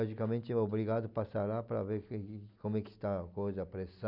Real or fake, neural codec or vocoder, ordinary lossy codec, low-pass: real; none; none; 5.4 kHz